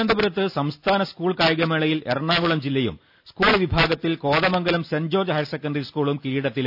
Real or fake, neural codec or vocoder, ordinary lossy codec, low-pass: real; none; none; 5.4 kHz